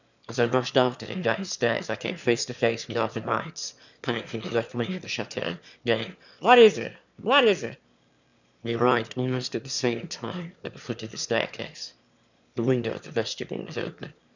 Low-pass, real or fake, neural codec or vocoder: 7.2 kHz; fake; autoencoder, 22.05 kHz, a latent of 192 numbers a frame, VITS, trained on one speaker